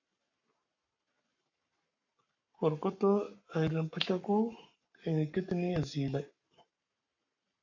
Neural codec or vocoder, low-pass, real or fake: codec, 44.1 kHz, 7.8 kbps, Pupu-Codec; 7.2 kHz; fake